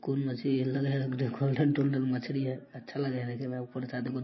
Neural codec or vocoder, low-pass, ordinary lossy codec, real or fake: none; 7.2 kHz; MP3, 24 kbps; real